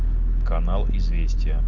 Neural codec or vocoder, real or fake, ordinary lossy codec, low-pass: none; real; Opus, 24 kbps; 7.2 kHz